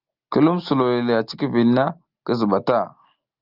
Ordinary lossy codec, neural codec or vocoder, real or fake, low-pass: Opus, 24 kbps; none; real; 5.4 kHz